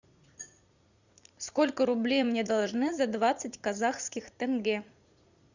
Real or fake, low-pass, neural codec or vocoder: fake; 7.2 kHz; vocoder, 44.1 kHz, 80 mel bands, Vocos